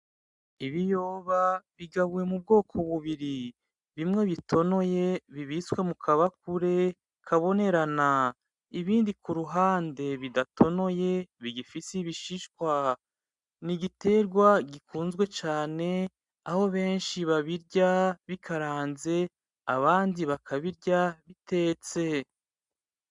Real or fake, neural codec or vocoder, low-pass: real; none; 10.8 kHz